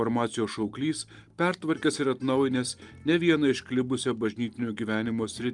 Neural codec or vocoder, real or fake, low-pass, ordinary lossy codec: none; real; 10.8 kHz; Opus, 32 kbps